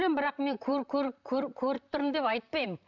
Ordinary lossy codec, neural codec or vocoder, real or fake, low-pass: none; vocoder, 44.1 kHz, 128 mel bands, Pupu-Vocoder; fake; 7.2 kHz